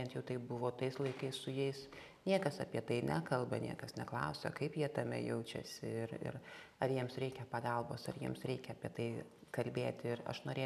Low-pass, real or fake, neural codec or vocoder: 14.4 kHz; real; none